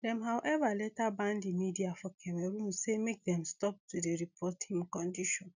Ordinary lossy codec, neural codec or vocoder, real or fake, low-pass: none; none; real; 7.2 kHz